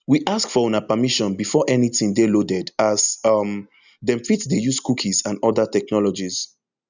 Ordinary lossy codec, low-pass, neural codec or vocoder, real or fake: none; 7.2 kHz; none; real